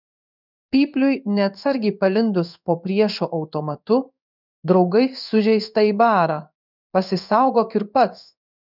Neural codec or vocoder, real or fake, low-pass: codec, 16 kHz in and 24 kHz out, 1 kbps, XY-Tokenizer; fake; 5.4 kHz